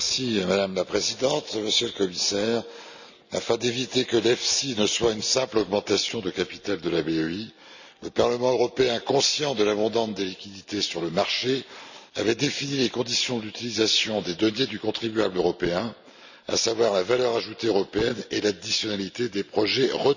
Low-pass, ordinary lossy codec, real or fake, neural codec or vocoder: 7.2 kHz; none; real; none